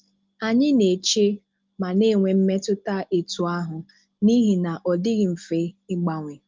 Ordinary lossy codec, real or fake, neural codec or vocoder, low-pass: Opus, 32 kbps; real; none; 7.2 kHz